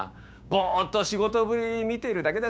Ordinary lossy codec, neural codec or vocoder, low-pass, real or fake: none; codec, 16 kHz, 6 kbps, DAC; none; fake